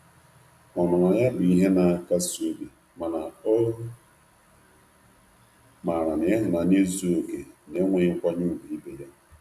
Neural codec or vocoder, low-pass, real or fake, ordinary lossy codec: none; 14.4 kHz; real; none